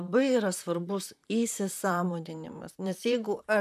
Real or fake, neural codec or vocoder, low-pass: fake; vocoder, 44.1 kHz, 128 mel bands, Pupu-Vocoder; 14.4 kHz